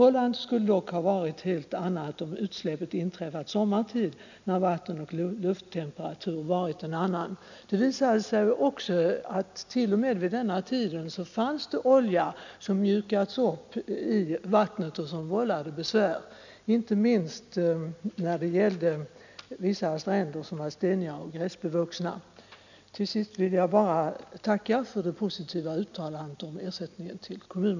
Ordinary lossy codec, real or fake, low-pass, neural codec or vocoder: none; real; 7.2 kHz; none